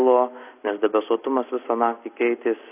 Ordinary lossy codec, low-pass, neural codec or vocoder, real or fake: AAC, 24 kbps; 3.6 kHz; none; real